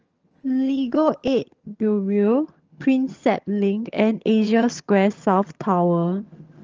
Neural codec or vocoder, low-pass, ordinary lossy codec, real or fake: vocoder, 22.05 kHz, 80 mel bands, HiFi-GAN; 7.2 kHz; Opus, 24 kbps; fake